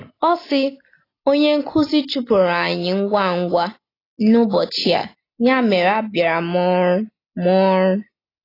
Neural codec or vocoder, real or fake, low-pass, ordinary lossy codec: none; real; 5.4 kHz; AAC, 24 kbps